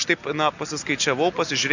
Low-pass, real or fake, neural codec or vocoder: 7.2 kHz; real; none